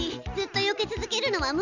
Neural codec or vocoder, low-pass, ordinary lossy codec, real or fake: none; 7.2 kHz; none; real